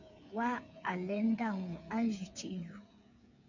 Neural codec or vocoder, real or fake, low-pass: codec, 16 kHz, 8 kbps, FreqCodec, smaller model; fake; 7.2 kHz